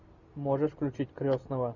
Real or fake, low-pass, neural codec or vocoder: real; 7.2 kHz; none